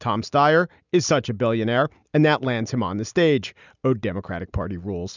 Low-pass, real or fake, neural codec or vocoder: 7.2 kHz; real; none